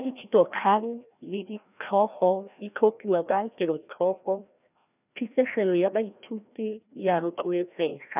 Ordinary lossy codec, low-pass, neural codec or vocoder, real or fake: none; 3.6 kHz; codec, 16 kHz, 1 kbps, FreqCodec, larger model; fake